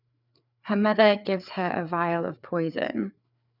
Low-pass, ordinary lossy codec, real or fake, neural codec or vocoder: 5.4 kHz; none; fake; codec, 16 kHz, 4 kbps, FreqCodec, larger model